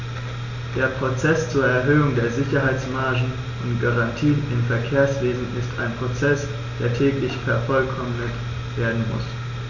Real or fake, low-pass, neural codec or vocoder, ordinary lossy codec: real; 7.2 kHz; none; none